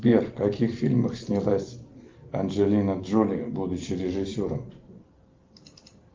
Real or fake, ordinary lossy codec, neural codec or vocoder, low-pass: real; Opus, 32 kbps; none; 7.2 kHz